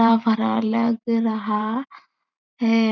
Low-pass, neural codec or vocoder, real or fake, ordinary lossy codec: 7.2 kHz; vocoder, 44.1 kHz, 128 mel bands every 512 samples, BigVGAN v2; fake; none